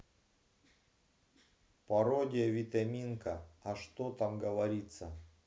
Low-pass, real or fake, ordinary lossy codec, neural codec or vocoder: none; real; none; none